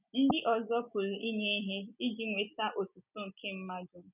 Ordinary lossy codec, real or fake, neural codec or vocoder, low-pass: none; real; none; 3.6 kHz